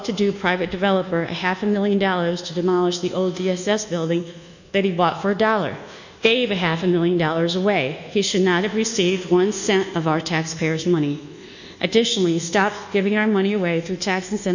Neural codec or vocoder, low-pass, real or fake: codec, 24 kHz, 1.2 kbps, DualCodec; 7.2 kHz; fake